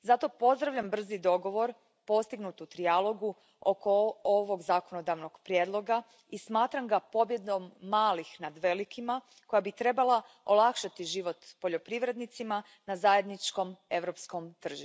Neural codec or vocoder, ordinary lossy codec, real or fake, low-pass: none; none; real; none